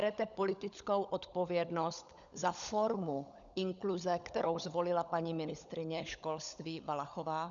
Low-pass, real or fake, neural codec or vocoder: 7.2 kHz; fake; codec, 16 kHz, 16 kbps, FunCodec, trained on LibriTTS, 50 frames a second